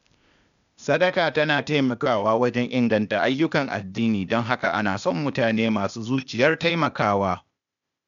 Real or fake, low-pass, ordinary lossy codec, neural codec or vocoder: fake; 7.2 kHz; none; codec, 16 kHz, 0.8 kbps, ZipCodec